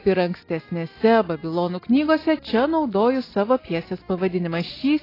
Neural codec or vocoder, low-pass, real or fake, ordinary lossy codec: none; 5.4 kHz; real; AAC, 24 kbps